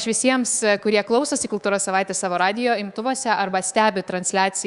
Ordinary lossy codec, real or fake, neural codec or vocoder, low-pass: Opus, 64 kbps; fake; codec, 24 kHz, 3.1 kbps, DualCodec; 10.8 kHz